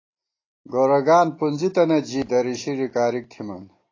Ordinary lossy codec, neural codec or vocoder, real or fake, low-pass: AAC, 32 kbps; none; real; 7.2 kHz